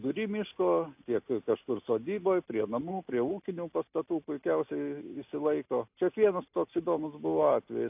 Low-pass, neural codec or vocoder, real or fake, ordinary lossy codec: 3.6 kHz; none; real; AAC, 32 kbps